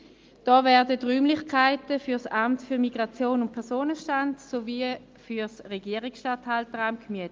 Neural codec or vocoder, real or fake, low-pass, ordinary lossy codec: none; real; 7.2 kHz; Opus, 24 kbps